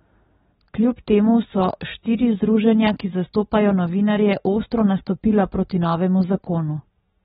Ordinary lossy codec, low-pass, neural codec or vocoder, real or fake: AAC, 16 kbps; 10.8 kHz; none; real